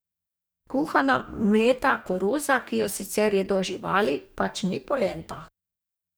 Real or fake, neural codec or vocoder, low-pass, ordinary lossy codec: fake; codec, 44.1 kHz, 2.6 kbps, DAC; none; none